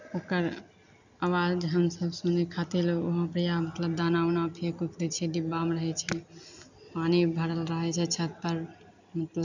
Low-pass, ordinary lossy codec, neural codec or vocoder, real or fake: 7.2 kHz; none; none; real